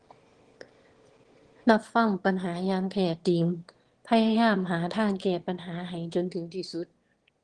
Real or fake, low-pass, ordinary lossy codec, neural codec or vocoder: fake; 9.9 kHz; Opus, 16 kbps; autoencoder, 22.05 kHz, a latent of 192 numbers a frame, VITS, trained on one speaker